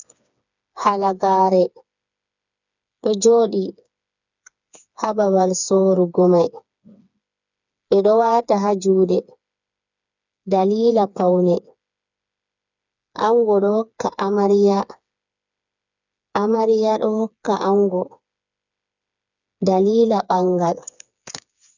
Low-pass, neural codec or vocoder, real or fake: 7.2 kHz; codec, 16 kHz, 4 kbps, FreqCodec, smaller model; fake